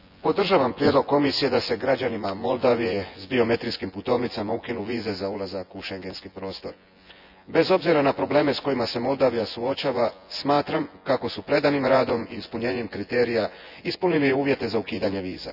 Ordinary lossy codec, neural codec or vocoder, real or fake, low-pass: none; vocoder, 24 kHz, 100 mel bands, Vocos; fake; 5.4 kHz